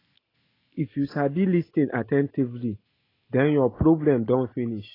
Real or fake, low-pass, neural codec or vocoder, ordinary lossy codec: real; 5.4 kHz; none; AAC, 24 kbps